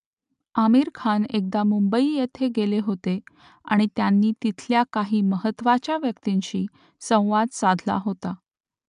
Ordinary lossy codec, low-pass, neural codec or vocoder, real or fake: MP3, 96 kbps; 10.8 kHz; none; real